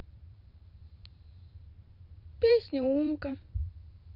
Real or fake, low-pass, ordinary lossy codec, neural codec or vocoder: fake; 5.4 kHz; none; vocoder, 44.1 kHz, 128 mel bands every 512 samples, BigVGAN v2